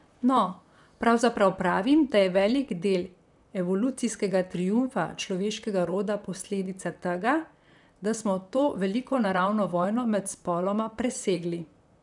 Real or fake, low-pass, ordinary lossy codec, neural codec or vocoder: fake; 10.8 kHz; none; vocoder, 44.1 kHz, 128 mel bands, Pupu-Vocoder